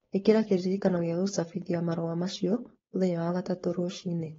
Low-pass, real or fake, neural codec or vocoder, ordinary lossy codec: 7.2 kHz; fake; codec, 16 kHz, 4.8 kbps, FACodec; AAC, 24 kbps